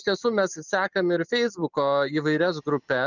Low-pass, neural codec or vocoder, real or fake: 7.2 kHz; none; real